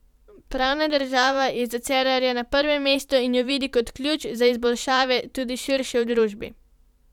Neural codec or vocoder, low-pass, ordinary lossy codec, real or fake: vocoder, 44.1 kHz, 128 mel bands every 512 samples, BigVGAN v2; 19.8 kHz; none; fake